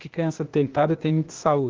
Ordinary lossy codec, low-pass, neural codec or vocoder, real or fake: Opus, 16 kbps; 7.2 kHz; codec, 16 kHz, 0.8 kbps, ZipCodec; fake